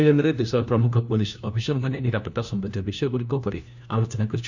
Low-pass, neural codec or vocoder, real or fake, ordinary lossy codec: 7.2 kHz; codec, 16 kHz, 1 kbps, FunCodec, trained on LibriTTS, 50 frames a second; fake; none